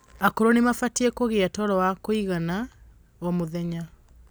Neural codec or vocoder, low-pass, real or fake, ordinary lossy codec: none; none; real; none